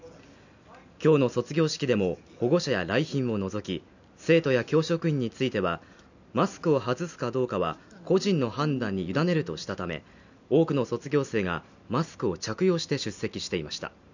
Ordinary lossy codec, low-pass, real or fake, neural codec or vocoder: none; 7.2 kHz; real; none